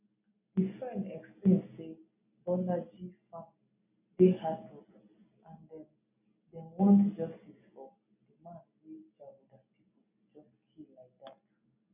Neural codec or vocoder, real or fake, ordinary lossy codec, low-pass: none; real; none; 3.6 kHz